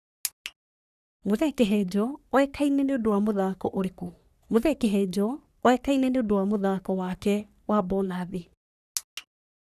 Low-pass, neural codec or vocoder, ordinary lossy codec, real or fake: 14.4 kHz; codec, 44.1 kHz, 3.4 kbps, Pupu-Codec; none; fake